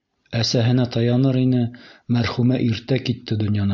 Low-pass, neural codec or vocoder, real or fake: 7.2 kHz; none; real